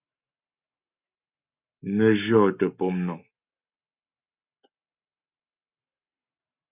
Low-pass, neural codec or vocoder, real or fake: 3.6 kHz; codec, 44.1 kHz, 7.8 kbps, Pupu-Codec; fake